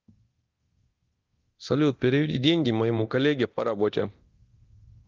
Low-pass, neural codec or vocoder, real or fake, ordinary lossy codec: 7.2 kHz; codec, 24 kHz, 0.9 kbps, DualCodec; fake; Opus, 32 kbps